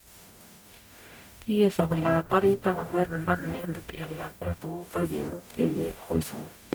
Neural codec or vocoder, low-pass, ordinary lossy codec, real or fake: codec, 44.1 kHz, 0.9 kbps, DAC; none; none; fake